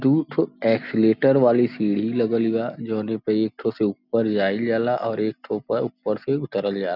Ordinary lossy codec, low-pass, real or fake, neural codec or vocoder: none; 5.4 kHz; real; none